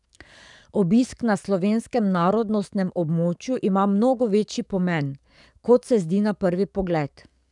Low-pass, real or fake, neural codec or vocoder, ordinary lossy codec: 10.8 kHz; fake; codec, 44.1 kHz, 7.8 kbps, DAC; none